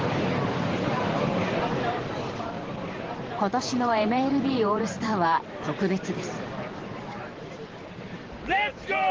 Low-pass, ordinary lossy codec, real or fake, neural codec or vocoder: 7.2 kHz; Opus, 16 kbps; fake; vocoder, 44.1 kHz, 128 mel bands every 512 samples, BigVGAN v2